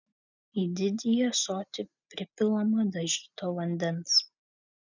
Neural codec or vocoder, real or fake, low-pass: none; real; 7.2 kHz